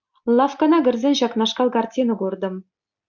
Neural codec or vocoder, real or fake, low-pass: none; real; 7.2 kHz